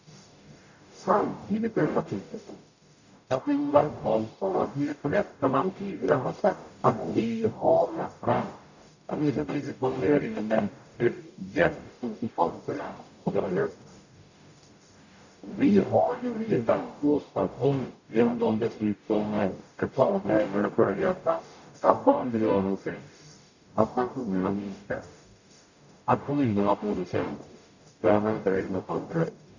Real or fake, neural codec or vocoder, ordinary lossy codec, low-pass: fake; codec, 44.1 kHz, 0.9 kbps, DAC; none; 7.2 kHz